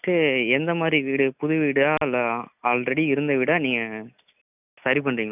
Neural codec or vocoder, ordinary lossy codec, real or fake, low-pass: none; none; real; 3.6 kHz